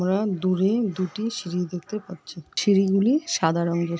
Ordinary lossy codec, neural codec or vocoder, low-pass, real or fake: none; none; none; real